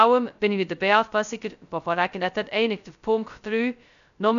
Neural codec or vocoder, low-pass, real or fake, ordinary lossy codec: codec, 16 kHz, 0.2 kbps, FocalCodec; 7.2 kHz; fake; none